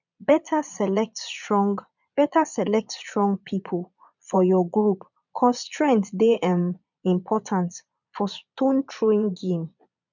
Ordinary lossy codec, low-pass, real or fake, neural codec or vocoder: none; 7.2 kHz; fake; vocoder, 22.05 kHz, 80 mel bands, Vocos